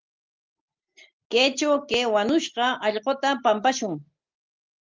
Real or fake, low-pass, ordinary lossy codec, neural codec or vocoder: real; 7.2 kHz; Opus, 32 kbps; none